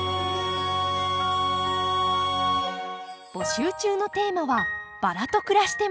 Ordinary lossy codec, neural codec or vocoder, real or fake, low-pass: none; none; real; none